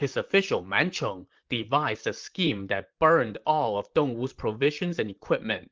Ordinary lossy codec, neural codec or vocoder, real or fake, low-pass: Opus, 24 kbps; vocoder, 44.1 kHz, 128 mel bands, Pupu-Vocoder; fake; 7.2 kHz